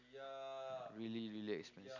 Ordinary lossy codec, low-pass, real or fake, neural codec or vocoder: none; 7.2 kHz; real; none